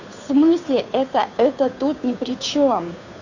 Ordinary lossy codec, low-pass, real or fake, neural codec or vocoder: none; 7.2 kHz; fake; codec, 16 kHz, 2 kbps, FunCodec, trained on Chinese and English, 25 frames a second